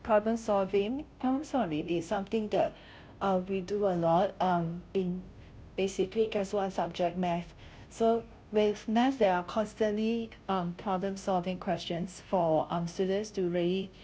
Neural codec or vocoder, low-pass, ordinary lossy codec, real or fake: codec, 16 kHz, 0.5 kbps, FunCodec, trained on Chinese and English, 25 frames a second; none; none; fake